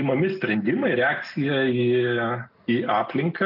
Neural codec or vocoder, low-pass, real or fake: none; 5.4 kHz; real